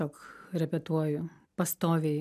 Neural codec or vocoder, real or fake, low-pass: none; real; 14.4 kHz